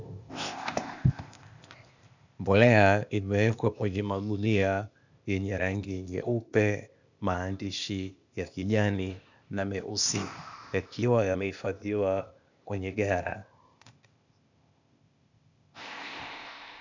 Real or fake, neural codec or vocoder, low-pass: fake; codec, 16 kHz, 0.8 kbps, ZipCodec; 7.2 kHz